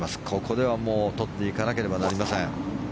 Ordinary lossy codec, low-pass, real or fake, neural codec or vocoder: none; none; real; none